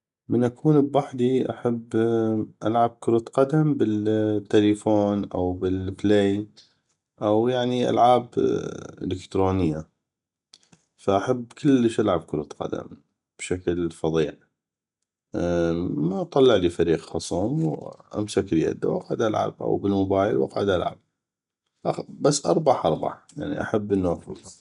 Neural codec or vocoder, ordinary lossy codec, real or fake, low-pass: none; none; real; 10.8 kHz